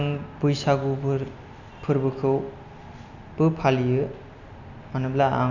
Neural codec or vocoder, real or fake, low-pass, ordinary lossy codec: none; real; 7.2 kHz; none